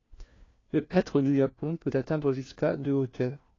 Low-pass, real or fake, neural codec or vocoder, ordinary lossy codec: 7.2 kHz; fake; codec, 16 kHz, 1 kbps, FunCodec, trained on LibriTTS, 50 frames a second; AAC, 32 kbps